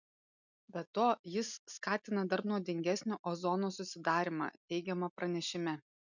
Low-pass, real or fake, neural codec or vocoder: 7.2 kHz; real; none